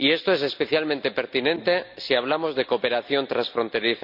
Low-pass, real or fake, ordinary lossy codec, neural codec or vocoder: 5.4 kHz; real; none; none